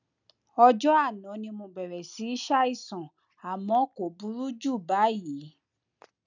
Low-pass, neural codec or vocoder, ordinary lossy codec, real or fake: 7.2 kHz; none; none; real